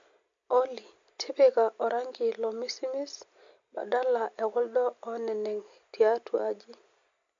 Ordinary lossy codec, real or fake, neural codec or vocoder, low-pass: MP3, 48 kbps; real; none; 7.2 kHz